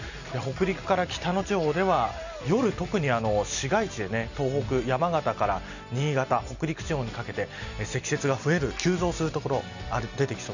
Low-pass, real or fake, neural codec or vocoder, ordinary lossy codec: 7.2 kHz; real; none; none